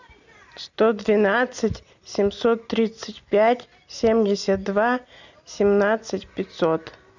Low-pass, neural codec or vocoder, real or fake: 7.2 kHz; none; real